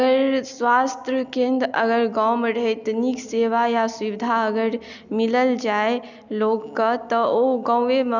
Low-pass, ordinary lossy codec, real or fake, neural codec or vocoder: 7.2 kHz; none; real; none